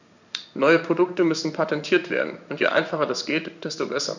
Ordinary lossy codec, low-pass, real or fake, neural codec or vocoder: none; 7.2 kHz; fake; codec, 16 kHz in and 24 kHz out, 1 kbps, XY-Tokenizer